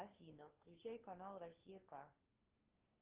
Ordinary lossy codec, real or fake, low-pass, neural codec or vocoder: Opus, 16 kbps; fake; 3.6 kHz; codec, 16 kHz, 2 kbps, FunCodec, trained on LibriTTS, 25 frames a second